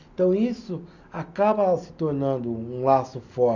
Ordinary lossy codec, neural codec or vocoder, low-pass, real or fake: none; none; 7.2 kHz; real